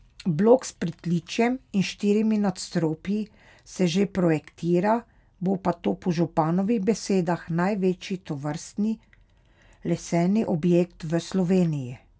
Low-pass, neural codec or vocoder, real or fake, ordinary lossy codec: none; none; real; none